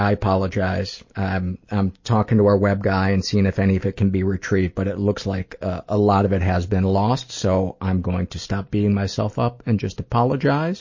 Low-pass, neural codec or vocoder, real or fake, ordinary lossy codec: 7.2 kHz; none; real; MP3, 32 kbps